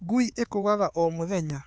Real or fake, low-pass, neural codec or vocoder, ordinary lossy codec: fake; none; codec, 16 kHz, 4 kbps, X-Codec, HuBERT features, trained on LibriSpeech; none